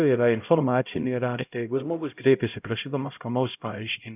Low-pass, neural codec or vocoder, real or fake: 3.6 kHz; codec, 16 kHz, 0.5 kbps, X-Codec, HuBERT features, trained on LibriSpeech; fake